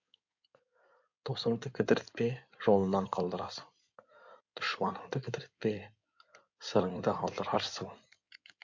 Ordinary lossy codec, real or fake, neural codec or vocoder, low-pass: MP3, 64 kbps; fake; codec, 16 kHz in and 24 kHz out, 2.2 kbps, FireRedTTS-2 codec; 7.2 kHz